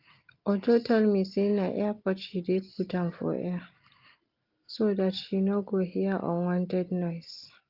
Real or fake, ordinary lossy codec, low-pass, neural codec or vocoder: real; Opus, 32 kbps; 5.4 kHz; none